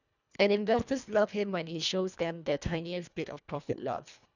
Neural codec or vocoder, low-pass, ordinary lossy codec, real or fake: codec, 24 kHz, 1.5 kbps, HILCodec; 7.2 kHz; none; fake